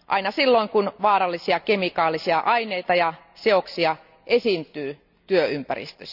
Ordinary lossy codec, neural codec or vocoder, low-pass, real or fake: none; none; 5.4 kHz; real